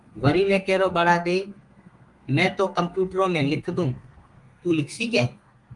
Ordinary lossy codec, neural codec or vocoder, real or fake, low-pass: Opus, 32 kbps; codec, 32 kHz, 1.9 kbps, SNAC; fake; 10.8 kHz